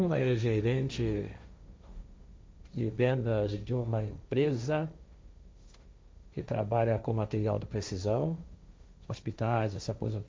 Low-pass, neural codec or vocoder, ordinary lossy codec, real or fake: none; codec, 16 kHz, 1.1 kbps, Voila-Tokenizer; none; fake